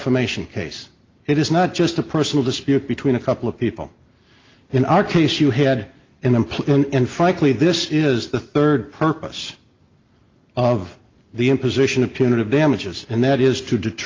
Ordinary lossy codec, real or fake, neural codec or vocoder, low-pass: Opus, 32 kbps; real; none; 7.2 kHz